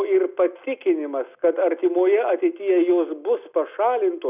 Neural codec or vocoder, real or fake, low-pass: none; real; 3.6 kHz